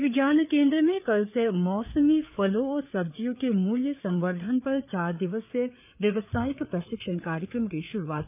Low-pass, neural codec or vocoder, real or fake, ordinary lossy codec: 3.6 kHz; codec, 16 kHz, 4 kbps, FreqCodec, larger model; fake; none